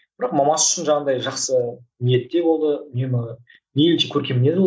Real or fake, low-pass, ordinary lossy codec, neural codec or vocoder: real; none; none; none